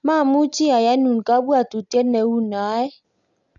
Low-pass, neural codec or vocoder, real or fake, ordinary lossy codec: 7.2 kHz; none; real; none